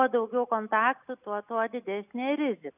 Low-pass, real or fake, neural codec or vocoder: 3.6 kHz; real; none